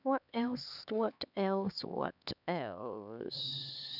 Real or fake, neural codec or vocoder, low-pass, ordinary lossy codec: fake; codec, 16 kHz, 4 kbps, X-Codec, HuBERT features, trained on LibriSpeech; 5.4 kHz; none